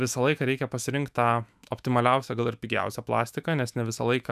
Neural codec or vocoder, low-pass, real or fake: autoencoder, 48 kHz, 128 numbers a frame, DAC-VAE, trained on Japanese speech; 14.4 kHz; fake